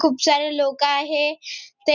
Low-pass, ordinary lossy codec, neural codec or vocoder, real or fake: 7.2 kHz; none; none; real